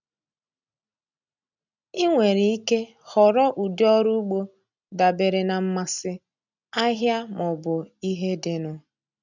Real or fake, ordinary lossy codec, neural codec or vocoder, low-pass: real; none; none; 7.2 kHz